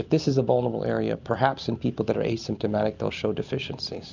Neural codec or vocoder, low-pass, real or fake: none; 7.2 kHz; real